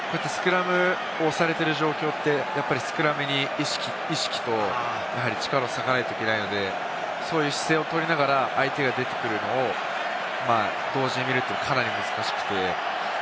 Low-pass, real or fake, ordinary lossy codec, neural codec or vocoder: none; real; none; none